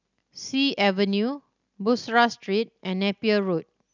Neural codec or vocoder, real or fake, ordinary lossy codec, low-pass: none; real; none; 7.2 kHz